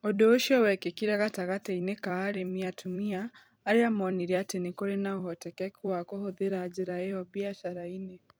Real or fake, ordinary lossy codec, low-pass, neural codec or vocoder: fake; none; none; vocoder, 44.1 kHz, 128 mel bands every 256 samples, BigVGAN v2